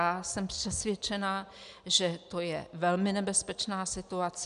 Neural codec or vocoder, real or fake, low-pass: none; real; 10.8 kHz